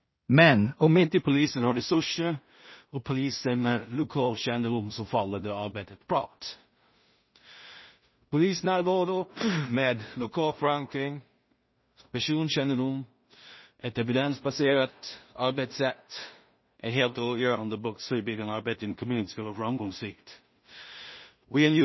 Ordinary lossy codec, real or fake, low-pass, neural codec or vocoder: MP3, 24 kbps; fake; 7.2 kHz; codec, 16 kHz in and 24 kHz out, 0.4 kbps, LongCat-Audio-Codec, two codebook decoder